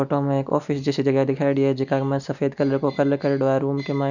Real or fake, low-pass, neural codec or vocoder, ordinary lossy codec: real; 7.2 kHz; none; none